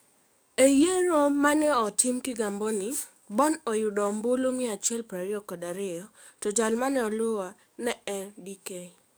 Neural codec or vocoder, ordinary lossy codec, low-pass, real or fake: codec, 44.1 kHz, 7.8 kbps, DAC; none; none; fake